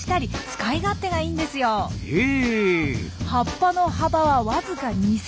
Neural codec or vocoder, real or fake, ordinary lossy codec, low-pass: none; real; none; none